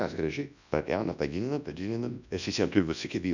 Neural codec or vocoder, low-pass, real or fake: codec, 24 kHz, 0.9 kbps, WavTokenizer, large speech release; 7.2 kHz; fake